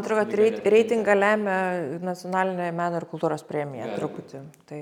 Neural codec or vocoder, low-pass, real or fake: none; 19.8 kHz; real